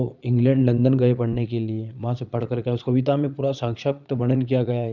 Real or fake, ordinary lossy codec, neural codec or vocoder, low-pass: fake; none; vocoder, 22.05 kHz, 80 mel bands, Vocos; 7.2 kHz